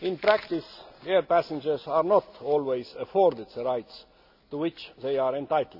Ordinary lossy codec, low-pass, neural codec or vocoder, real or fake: none; 5.4 kHz; none; real